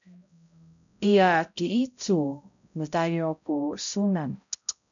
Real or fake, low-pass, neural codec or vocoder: fake; 7.2 kHz; codec, 16 kHz, 0.5 kbps, X-Codec, HuBERT features, trained on balanced general audio